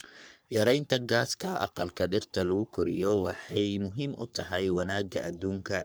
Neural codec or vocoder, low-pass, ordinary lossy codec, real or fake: codec, 44.1 kHz, 3.4 kbps, Pupu-Codec; none; none; fake